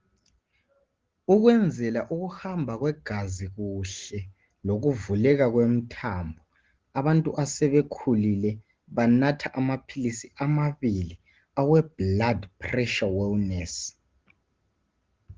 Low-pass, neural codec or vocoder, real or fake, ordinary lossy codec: 7.2 kHz; none; real; Opus, 16 kbps